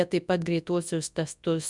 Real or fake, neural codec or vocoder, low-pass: fake; codec, 24 kHz, 0.9 kbps, WavTokenizer, large speech release; 10.8 kHz